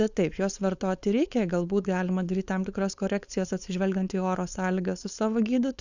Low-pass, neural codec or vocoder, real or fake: 7.2 kHz; codec, 16 kHz, 4.8 kbps, FACodec; fake